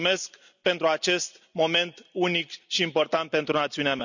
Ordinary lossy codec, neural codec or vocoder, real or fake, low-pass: none; none; real; 7.2 kHz